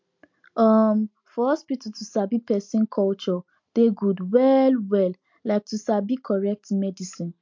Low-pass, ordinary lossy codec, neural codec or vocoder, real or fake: 7.2 kHz; MP3, 48 kbps; none; real